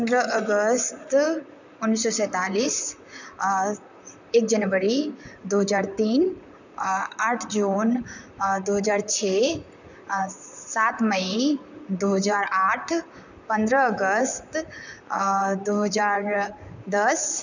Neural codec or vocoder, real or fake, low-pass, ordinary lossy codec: vocoder, 44.1 kHz, 128 mel bands, Pupu-Vocoder; fake; 7.2 kHz; none